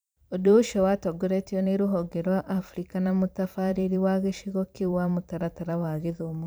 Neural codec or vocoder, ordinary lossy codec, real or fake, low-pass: vocoder, 44.1 kHz, 128 mel bands every 512 samples, BigVGAN v2; none; fake; none